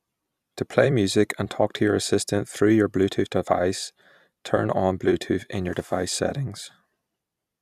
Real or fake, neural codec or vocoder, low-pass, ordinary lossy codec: fake; vocoder, 44.1 kHz, 128 mel bands every 256 samples, BigVGAN v2; 14.4 kHz; none